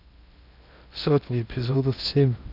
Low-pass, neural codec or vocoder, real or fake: 5.4 kHz; codec, 16 kHz in and 24 kHz out, 0.6 kbps, FocalCodec, streaming, 2048 codes; fake